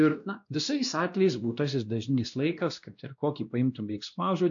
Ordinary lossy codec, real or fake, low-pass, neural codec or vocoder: AAC, 64 kbps; fake; 7.2 kHz; codec, 16 kHz, 1 kbps, X-Codec, WavLM features, trained on Multilingual LibriSpeech